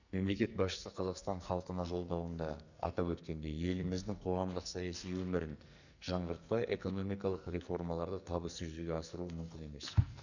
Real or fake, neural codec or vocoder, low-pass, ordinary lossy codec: fake; codec, 44.1 kHz, 2.6 kbps, SNAC; 7.2 kHz; none